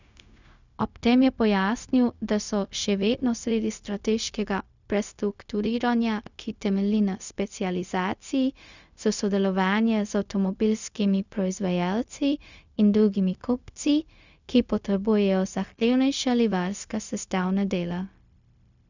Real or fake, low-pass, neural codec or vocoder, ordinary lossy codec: fake; 7.2 kHz; codec, 16 kHz, 0.4 kbps, LongCat-Audio-Codec; none